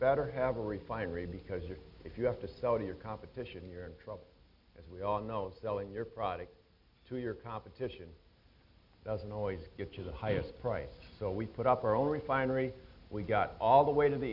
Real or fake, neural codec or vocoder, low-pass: real; none; 5.4 kHz